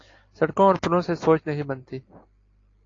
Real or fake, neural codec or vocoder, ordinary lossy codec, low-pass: real; none; AAC, 32 kbps; 7.2 kHz